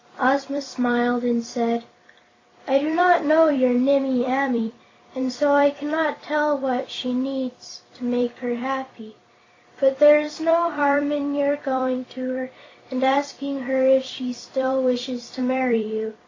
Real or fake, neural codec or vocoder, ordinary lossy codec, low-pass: fake; vocoder, 44.1 kHz, 128 mel bands every 256 samples, BigVGAN v2; AAC, 32 kbps; 7.2 kHz